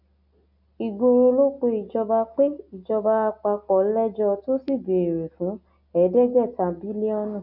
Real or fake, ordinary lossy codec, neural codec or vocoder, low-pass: real; none; none; 5.4 kHz